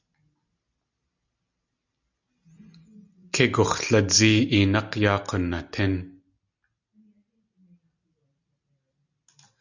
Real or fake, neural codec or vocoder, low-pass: real; none; 7.2 kHz